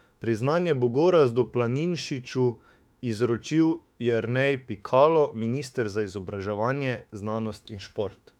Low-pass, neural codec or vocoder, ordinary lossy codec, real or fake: 19.8 kHz; autoencoder, 48 kHz, 32 numbers a frame, DAC-VAE, trained on Japanese speech; none; fake